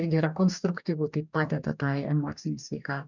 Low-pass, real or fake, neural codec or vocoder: 7.2 kHz; fake; codec, 44.1 kHz, 2.6 kbps, DAC